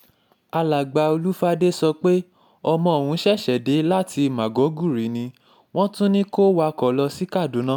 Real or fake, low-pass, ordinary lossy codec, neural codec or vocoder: real; 19.8 kHz; none; none